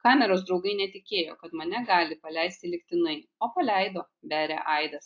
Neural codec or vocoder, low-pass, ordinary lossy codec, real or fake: none; 7.2 kHz; AAC, 48 kbps; real